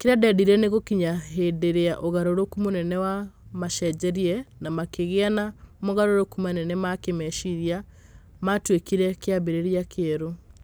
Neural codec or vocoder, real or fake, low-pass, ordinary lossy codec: none; real; none; none